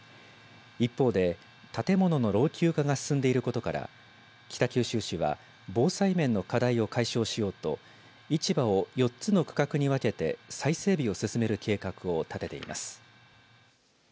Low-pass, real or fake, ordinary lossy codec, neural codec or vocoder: none; real; none; none